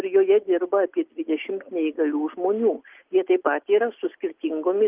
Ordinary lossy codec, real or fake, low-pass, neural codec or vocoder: Opus, 32 kbps; real; 3.6 kHz; none